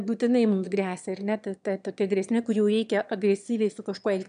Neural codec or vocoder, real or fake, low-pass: autoencoder, 22.05 kHz, a latent of 192 numbers a frame, VITS, trained on one speaker; fake; 9.9 kHz